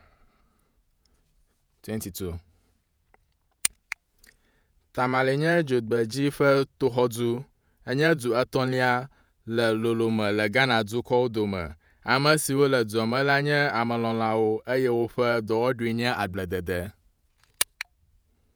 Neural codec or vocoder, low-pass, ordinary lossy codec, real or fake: vocoder, 48 kHz, 128 mel bands, Vocos; none; none; fake